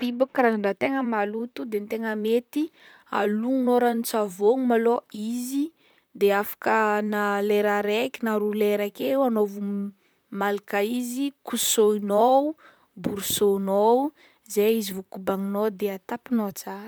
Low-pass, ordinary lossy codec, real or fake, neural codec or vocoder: none; none; fake; vocoder, 44.1 kHz, 128 mel bands, Pupu-Vocoder